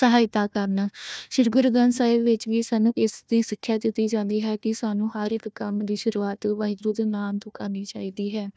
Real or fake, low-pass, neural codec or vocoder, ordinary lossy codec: fake; none; codec, 16 kHz, 1 kbps, FunCodec, trained on Chinese and English, 50 frames a second; none